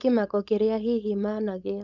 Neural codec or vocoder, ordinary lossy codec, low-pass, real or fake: codec, 16 kHz, 4.8 kbps, FACodec; none; 7.2 kHz; fake